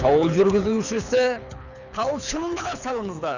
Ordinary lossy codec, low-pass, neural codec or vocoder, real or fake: none; 7.2 kHz; codec, 24 kHz, 6 kbps, HILCodec; fake